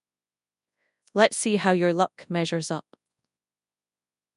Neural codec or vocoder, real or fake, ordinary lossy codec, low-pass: codec, 24 kHz, 0.9 kbps, WavTokenizer, large speech release; fake; none; 10.8 kHz